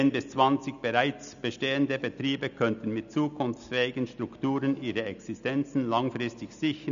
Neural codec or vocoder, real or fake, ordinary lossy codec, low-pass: none; real; none; 7.2 kHz